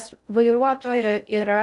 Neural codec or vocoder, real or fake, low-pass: codec, 16 kHz in and 24 kHz out, 0.6 kbps, FocalCodec, streaming, 4096 codes; fake; 10.8 kHz